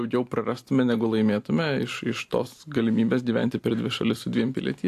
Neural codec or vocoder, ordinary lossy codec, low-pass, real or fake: none; AAC, 64 kbps; 14.4 kHz; real